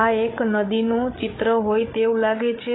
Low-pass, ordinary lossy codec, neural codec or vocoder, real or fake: 7.2 kHz; AAC, 16 kbps; codec, 16 kHz, 16 kbps, FreqCodec, larger model; fake